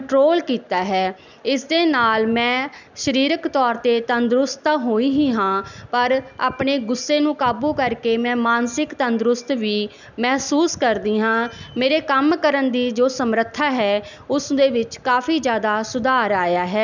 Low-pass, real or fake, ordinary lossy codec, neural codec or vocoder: 7.2 kHz; real; none; none